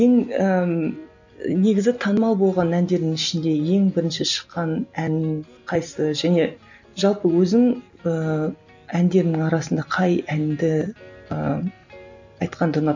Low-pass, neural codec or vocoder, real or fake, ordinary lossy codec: 7.2 kHz; none; real; none